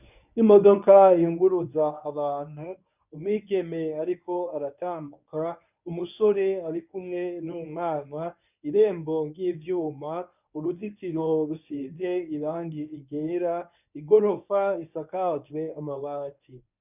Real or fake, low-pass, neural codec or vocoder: fake; 3.6 kHz; codec, 24 kHz, 0.9 kbps, WavTokenizer, medium speech release version 1